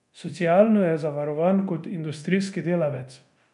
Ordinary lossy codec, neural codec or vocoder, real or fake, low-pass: none; codec, 24 kHz, 0.9 kbps, DualCodec; fake; 10.8 kHz